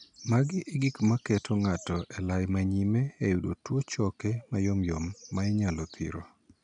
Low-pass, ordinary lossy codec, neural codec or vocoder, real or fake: 9.9 kHz; none; none; real